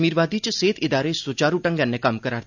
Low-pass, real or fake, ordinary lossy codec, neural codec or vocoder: 7.2 kHz; real; none; none